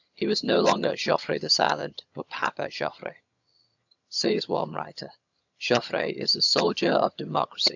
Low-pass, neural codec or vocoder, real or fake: 7.2 kHz; vocoder, 22.05 kHz, 80 mel bands, HiFi-GAN; fake